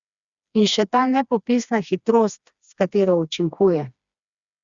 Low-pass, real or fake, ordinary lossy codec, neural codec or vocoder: 7.2 kHz; fake; Opus, 64 kbps; codec, 16 kHz, 2 kbps, FreqCodec, smaller model